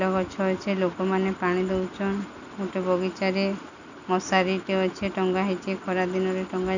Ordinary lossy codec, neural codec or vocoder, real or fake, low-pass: none; none; real; 7.2 kHz